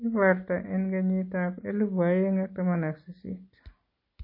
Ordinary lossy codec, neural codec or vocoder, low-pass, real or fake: MP3, 24 kbps; none; 5.4 kHz; real